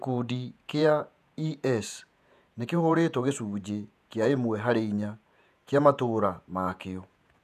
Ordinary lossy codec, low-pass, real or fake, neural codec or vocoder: none; 14.4 kHz; fake; vocoder, 48 kHz, 128 mel bands, Vocos